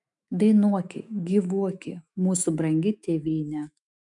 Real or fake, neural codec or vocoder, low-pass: fake; autoencoder, 48 kHz, 128 numbers a frame, DAC-VAE, trained on Japanese speech; 10.8 kHz